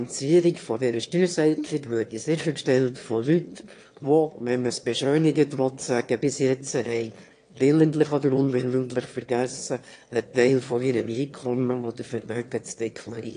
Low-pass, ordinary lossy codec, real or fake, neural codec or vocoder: 9.9 kHz; AAC, 48 kbps; fake; autoencoder, 22.05 kHz, a latent of 192 numbers a frame, VITS, trained on one speaker